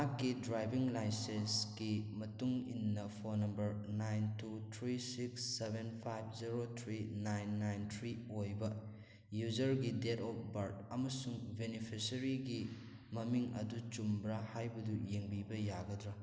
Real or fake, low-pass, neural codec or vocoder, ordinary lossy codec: real; none; none; none